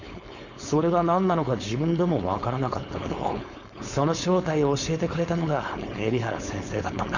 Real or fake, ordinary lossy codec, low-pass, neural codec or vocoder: fake; none; 7.2 kHz; codec, 16 kHz, 4.8 kbps, FACodec